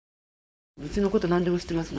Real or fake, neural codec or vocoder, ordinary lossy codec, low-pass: fake; codec, 16 kHz, 4.8 kbps, FACodec; none; none